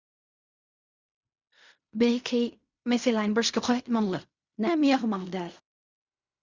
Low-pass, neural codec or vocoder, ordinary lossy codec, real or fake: 7.2 kHz; codec, 16 kHz in and 24 kHz out, 0.4 kbps, LongCat-Audio-Codec, fine tuned four codebook decoder; Opus, 64 kbps; fake